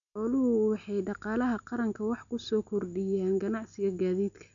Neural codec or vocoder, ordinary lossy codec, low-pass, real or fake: none; none; 7.2 kHz; real